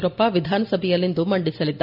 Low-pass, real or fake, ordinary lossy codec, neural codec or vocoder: 5.4 kHz; real; none; none